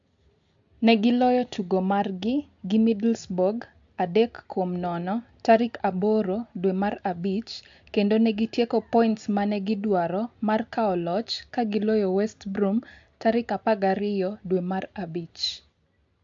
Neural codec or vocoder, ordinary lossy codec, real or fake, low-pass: none; AAC, 64 kbps; real; 7.2 kHz